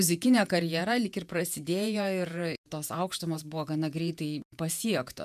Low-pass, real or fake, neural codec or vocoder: 14.4 kHz; fake; vocoder, 48 kHz, 128 mel bands, Vocos